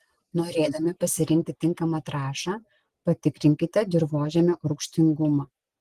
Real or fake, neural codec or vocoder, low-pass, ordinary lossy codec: real; none; 14.4 kHz; Opus, 16 kbps